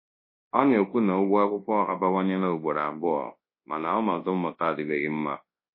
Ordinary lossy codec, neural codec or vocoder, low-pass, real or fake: MP3, 24 kbps; codec, 24 kHz, 0.9 kbps, WavTokenizer, large speech release; 5.4 kHz; fake